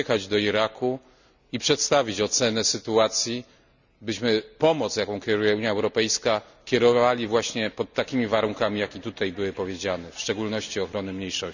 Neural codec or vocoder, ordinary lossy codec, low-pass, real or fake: none; none; 7.2 kHz; real